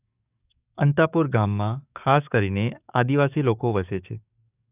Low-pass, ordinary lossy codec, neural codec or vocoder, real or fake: 3.6 kHz; none; codec, 44.1 kHz, 7.8 kbps, DAC; fake